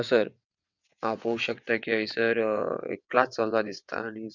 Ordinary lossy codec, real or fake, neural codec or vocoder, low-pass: none; fake; vocoder, 22.05 kHz, 80 mel bands, WaveNeXt; 7.2 kHz